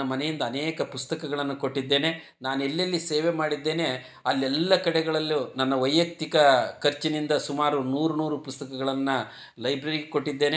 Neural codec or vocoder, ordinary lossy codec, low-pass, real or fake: none; none; none; real